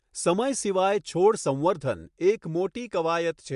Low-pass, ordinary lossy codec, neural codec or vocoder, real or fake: 10.8 kHz; MP3, 64 kbps; none; real